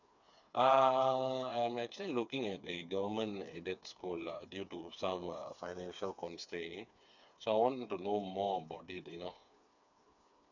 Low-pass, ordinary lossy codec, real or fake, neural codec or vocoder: 7.2 kHz; none; fake; codec, 16 kHz, 4 kbps, FreqCodec, smaller model